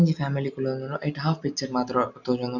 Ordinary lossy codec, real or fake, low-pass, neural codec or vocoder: none; real; 7.2 kHz; none